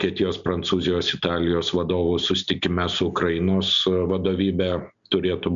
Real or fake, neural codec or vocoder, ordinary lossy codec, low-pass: real; none; MP3, 96 kbps; 7.2 kHz